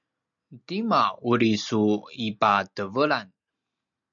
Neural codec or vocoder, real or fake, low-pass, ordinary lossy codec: none; real; 7.2 kHz; MP3, 64 kbps